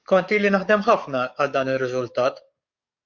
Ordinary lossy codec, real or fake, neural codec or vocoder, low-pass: Opus, 64 kbps; fake; codec, 44.1 kHz, 7.8 kbps, DAC; 7.2 kHz